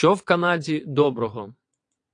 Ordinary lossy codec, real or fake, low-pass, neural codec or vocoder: AAC, 48 kbps; fake; 9.9 kHz; vocoder, 22.05 kHz, 80 mel bands, WaveNeXt